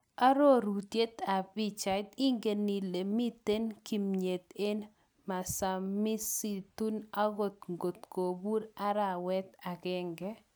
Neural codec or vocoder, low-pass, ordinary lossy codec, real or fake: none; none; none; real